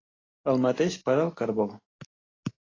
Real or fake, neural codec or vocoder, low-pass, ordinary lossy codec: real; none; 7.2 kHz; AAC, 32 kbps